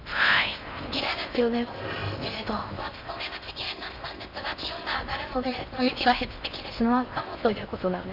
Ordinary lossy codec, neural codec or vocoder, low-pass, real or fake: none; codec, 16 kHz in and 24 kHz out, 0.6 kbps, FocalCodec, streaming, 4096 codes; 5.4 kHz; fake